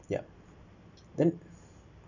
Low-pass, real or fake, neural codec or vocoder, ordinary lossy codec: 7.2 kHz; real; none; none